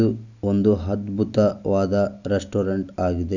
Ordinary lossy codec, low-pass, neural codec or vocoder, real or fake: none; 7.2 kHz; none; real